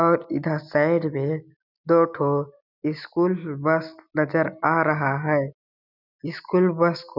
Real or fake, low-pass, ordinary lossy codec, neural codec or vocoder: real; 5.4 kHz; none; none